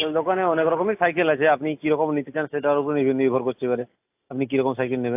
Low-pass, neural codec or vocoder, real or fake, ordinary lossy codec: 3.6 kHz; none; real; AAC, 32 kbps